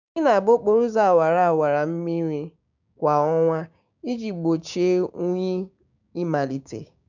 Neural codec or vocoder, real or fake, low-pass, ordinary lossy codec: codec, 16 kHz, 6 kbps, DAC; fake; 7.2 kHz; none